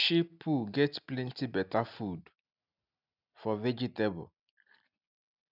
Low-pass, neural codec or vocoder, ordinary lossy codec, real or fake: 5.4 kHz; none; none; real